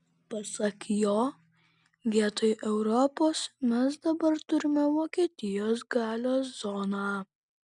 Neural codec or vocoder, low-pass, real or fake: none; 10.8 kHz; real